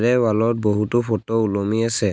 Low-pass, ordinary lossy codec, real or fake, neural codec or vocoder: none; none; real; none